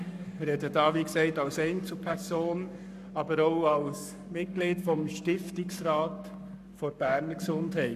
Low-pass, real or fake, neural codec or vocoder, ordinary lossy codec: 14.4 kHz; fake; codec, 44.1 kHz, 7.8 kbps, Pupu-Codec; none